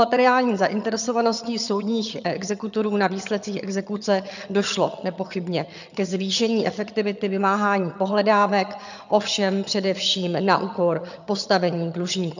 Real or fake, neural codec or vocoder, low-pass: fake; vocoder, 22.05 kHz, 80 mel bands, HiFi-GAN; 7.2 kHz